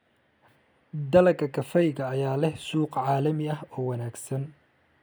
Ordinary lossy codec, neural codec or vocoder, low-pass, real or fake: none; none; none; real